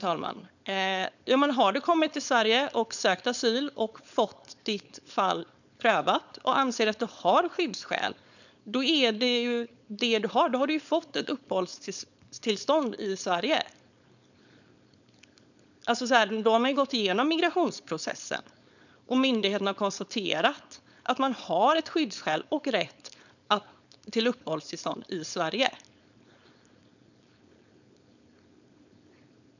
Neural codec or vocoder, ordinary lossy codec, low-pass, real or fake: codec, 16 kHz, 4.8 kbps, FACodec; none; 7.2 kHz; fake